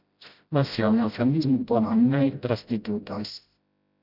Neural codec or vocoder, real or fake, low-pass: codec, 16 kHz, 0.5 kbps, FreqCodec, smaller model; fake; 5.4 kHz